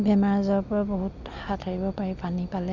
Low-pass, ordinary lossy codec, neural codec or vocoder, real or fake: 7.2 kHz; none; none; real